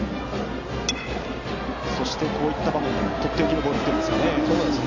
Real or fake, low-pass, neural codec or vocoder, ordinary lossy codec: real; 7.2 kHz; none; MP3, 64 kbps